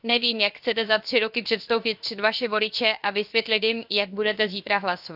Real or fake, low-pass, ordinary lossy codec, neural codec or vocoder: fake; 5.4 kHz; none; codec, 16 kHz, 0.7 kbps, FocalCodec